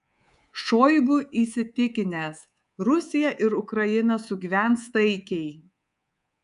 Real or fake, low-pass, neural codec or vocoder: fake; 10.8 kHz; codec, 24 kHz, 3.1 kbps, DualCodec